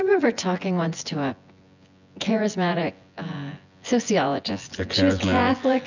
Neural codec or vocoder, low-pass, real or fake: vocoder, 24 kHz, 100 mel bands, Vocos; 7.2 kHz; fake